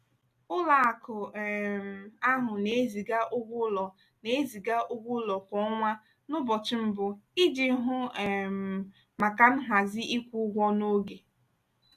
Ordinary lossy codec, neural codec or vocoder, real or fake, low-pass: none; none; real; 14.4 kHz